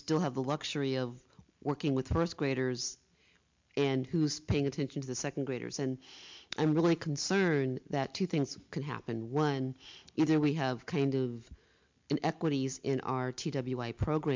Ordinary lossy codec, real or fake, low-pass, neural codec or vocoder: MP3, 64 kbps; real; 7.2 kHz; none